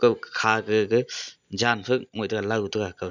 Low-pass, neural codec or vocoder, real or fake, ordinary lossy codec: 7.2 kHz; none; real; none